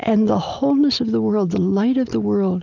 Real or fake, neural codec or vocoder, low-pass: real; none; 7.2 kHz